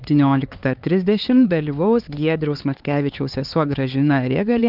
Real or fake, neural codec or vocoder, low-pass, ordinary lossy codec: fake; codec, 16 kHz, 4 kbps, X-Codec, HuBERT features, trained on LibriSpeech; 5.4 kHz; Opus, 32 kbps